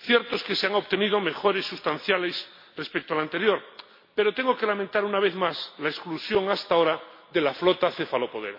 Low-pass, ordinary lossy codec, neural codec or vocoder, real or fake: 5.4 kHz; MP3, 32 kbps; none; real